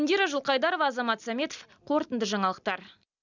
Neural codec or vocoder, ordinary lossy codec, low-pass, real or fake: none; none; 7.2 kHz; real